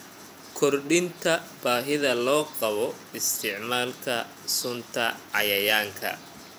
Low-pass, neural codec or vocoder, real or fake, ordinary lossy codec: none; vocoder, 44.1 kHz, 128 mel bands every 512 samples, BigVGAN v2; fake; none